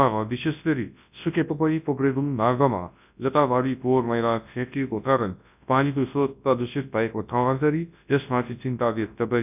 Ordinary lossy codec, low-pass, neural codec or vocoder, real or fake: none; 3.6 kHz; codec, 24 kHz, 0.9 kbps, WavTokenizer, large speech release; fake